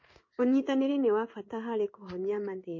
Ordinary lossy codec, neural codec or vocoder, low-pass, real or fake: MP3, 32 kbps; codec, 16 kHz in and 24 kHz out, 1 kbps, XY-Tokenizer; 7.2 kHz; fake